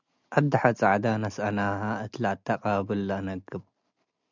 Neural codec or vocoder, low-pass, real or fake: none; 7.2 kHz; real